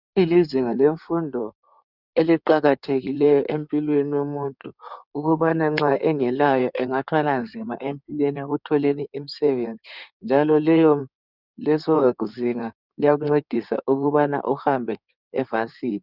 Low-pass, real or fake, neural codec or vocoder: 5.4 kHz; fake; codec, 16 kHz in and 24 kHz out, 2.2 kbps, FireRedTTS-2 codec